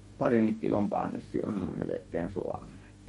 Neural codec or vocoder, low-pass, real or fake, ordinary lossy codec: codec, 44.1 kHz, 2.6 kbps, DAC; 19.8 kHz; fake; MP3, 48 kbps